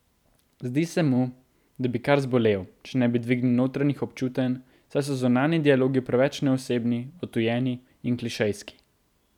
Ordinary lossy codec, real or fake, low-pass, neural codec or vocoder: none; real; 19.8 kHz; none